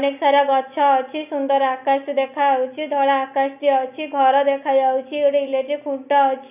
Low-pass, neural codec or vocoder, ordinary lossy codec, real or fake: 3.6 kHz; none; none; real